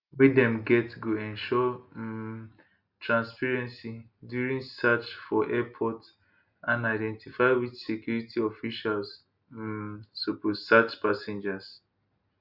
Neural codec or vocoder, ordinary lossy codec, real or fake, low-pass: none; none; real; 5.4 kHz